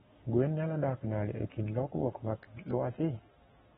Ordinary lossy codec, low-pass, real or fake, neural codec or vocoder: AAC, 16 kbps; 19.8 kHz; fake; codec, 44.1 kHz, 7.8 kbps, Pupu-Codec